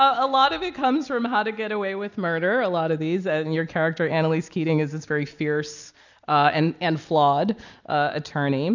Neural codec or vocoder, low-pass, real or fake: none; 7.2 kHz; real